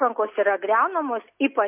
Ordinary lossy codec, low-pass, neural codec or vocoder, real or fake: MP3, 24 kbps; 3.6 kHz; none; real